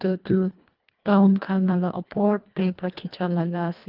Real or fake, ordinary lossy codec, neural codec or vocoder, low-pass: fake; Opus, 32 kbps; codec, 24 kHz, 1.5 kbps, HILCodec; 5.4 kHz